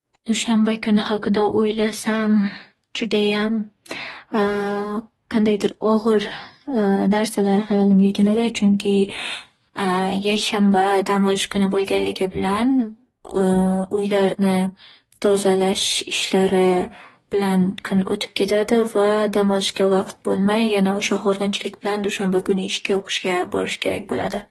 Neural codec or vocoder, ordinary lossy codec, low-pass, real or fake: codec, 44.1 kHz, 2.6 kbps, DAC; AAC, 32 kbps; 19.8 kHz; fake